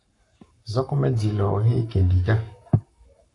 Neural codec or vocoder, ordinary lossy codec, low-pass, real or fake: codec, 44.1 kHz, 7.8 kbps, Pupu-Codec; AAC, 48 kbps; 10.8 kHz; fake